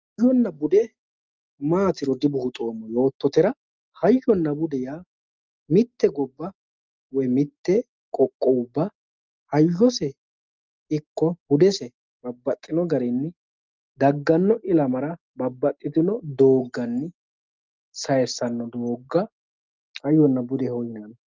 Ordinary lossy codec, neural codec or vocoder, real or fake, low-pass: Opus, 32 kbps; none; real; 7.2 kHz